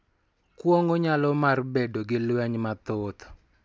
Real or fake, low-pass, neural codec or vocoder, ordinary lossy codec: real; none; none; none